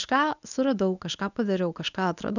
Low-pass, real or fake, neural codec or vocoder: 7.2 kHz; fake; codec, 24 kHz, 0.9 kbps, WavTokenizer, medium speech release version 1